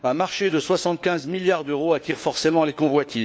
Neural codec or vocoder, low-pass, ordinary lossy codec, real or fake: codec, 16 kHz, 4 kbps, FunCodec, trained on LibriTTS, 50 frames a second; none; none; fake